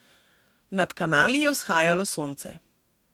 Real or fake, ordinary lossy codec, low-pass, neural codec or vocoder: fake; MP3, 96 kbps; 19.8 kHz; codec, 44.1 kHz, 2.6 kbps, DAC